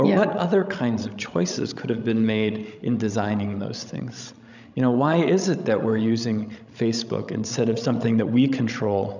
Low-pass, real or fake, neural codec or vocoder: 7.2 kHz; fake; codec, 16 kHz, 16 kbps, FreqCodec, larger model